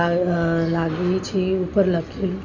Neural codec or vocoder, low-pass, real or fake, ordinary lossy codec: none; 7.2 kHz; real; none